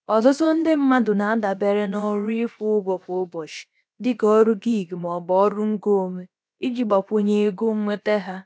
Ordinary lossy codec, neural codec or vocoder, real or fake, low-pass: none; codec, 16 kHz, about 1 kbps, DyCAST, with the encoder's durations; fake; none